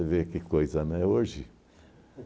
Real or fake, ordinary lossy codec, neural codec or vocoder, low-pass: real; none; none; none